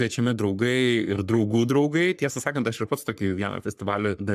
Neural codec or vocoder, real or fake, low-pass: codec, 44.1 kHz, 3.4 kbps, Pupu-Codec; fake; 14.4 kHz